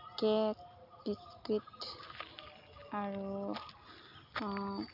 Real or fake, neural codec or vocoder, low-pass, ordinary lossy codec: real; none; 5.4 kHz; AAC, 48 kbps